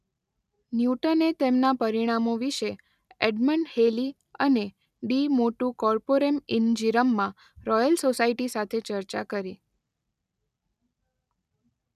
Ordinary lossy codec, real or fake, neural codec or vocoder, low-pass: none; real; none; 14.4 kHz